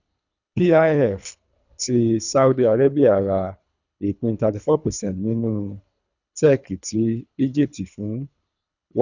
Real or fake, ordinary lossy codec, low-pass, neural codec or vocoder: fake; none; 7.2 kHz; codec, 24 kHz, 3 kbps, HILCodec